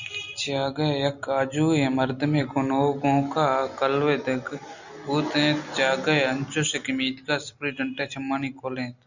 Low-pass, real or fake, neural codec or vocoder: 7.2 kHz; real; none